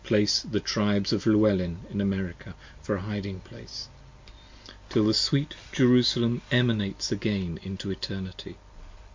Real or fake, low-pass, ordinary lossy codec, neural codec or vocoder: real; 7.2 kHz; MP3, 48 kbps; none